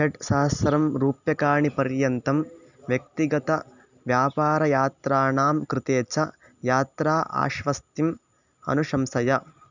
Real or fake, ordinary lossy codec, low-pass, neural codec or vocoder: real; none; 7.2 kHz; none